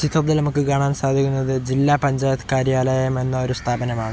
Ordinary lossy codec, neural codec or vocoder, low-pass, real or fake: none; none; none; real